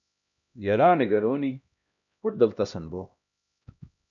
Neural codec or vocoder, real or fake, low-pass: codec, 16 kHz, 1 kbps, X-Codec, HuBERT features, trained on LibriSpeech; fake; 7.2 kHz